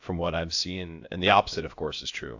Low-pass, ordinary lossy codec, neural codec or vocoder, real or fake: 7.2 kHz; AAC, 48 kbps; codec, 16 kHz, about 1 kbps, DyCAST, with the encoder's durations; fake